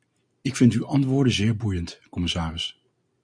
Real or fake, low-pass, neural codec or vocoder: real; 9.9 kHz; none